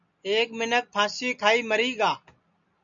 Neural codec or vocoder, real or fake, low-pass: none; real; 7.2 kHz